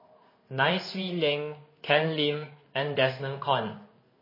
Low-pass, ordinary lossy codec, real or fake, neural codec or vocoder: 5.4 kHz; MP3, 24 kbps; fake; codec, 16 kHz in and 24 kHz out, 1 kbps, XY-Tokenizer